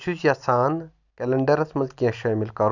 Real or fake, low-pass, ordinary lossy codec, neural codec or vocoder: real; 7.2 kHz; none; none